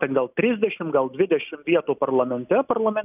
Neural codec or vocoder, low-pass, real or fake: none; 3.6 kHz; real